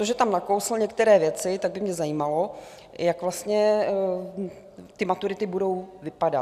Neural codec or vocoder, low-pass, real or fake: none; 14.4 kHz; real